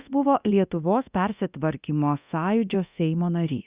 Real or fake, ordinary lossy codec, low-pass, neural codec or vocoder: fake; Opus, 64 kbps; 3.6 kHz; codec, 24 kHz, 0.9 kbps, DualCodec